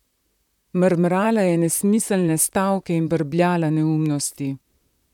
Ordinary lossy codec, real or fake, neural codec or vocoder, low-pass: none; fake; vocoder, 44.1 kHz, 128 mel bands, Pupu-Vocoder; 19.8 kHz